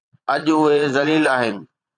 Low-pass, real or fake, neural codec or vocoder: 9.9 kHz; fake; vocoder, 22.05 kHz, 80 mel bands, Vocos